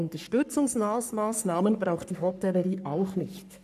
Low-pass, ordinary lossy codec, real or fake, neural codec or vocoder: 14.4 kHz; none; fake; codec, 44.1 kHz, 3.4 kbps, Pupu-Codec